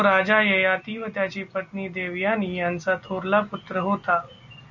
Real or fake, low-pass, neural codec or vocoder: real; 7.2 kHz; none